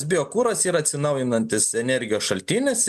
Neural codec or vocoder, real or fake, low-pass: none; real; 14.4 kHz